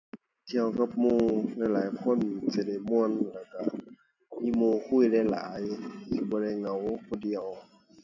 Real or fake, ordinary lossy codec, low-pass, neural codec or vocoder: real; none; 7.2 kHz; none